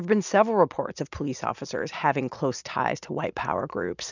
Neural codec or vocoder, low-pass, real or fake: none; 7.2 kHz; real